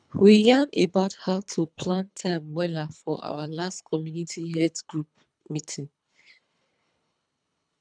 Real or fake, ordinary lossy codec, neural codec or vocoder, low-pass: fake; none; codec, 24 kHz, 3 kbps, HILCodec; 9.9 kHz